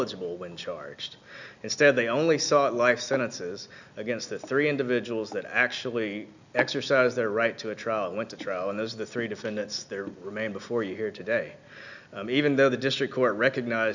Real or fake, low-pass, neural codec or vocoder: real; 7.2 kHz; none